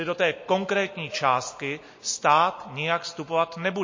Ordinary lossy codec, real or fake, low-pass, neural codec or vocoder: MP3, 32 kbps; real; 7.2 kHz; none